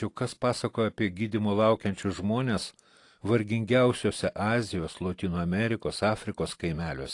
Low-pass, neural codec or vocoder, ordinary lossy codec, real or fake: 10.8 kHz; none; AAC, 48 kbps; real